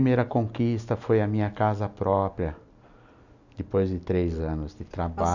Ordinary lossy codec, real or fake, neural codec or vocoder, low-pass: none; real; none; 7.2 kHz